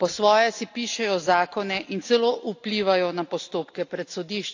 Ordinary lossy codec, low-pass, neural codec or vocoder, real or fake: AAC, 48 kbps; 7.2 kHz; none; real